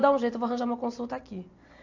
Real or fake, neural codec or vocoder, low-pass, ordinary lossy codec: real; none; 7.2 kHz; AAC, 48 kbps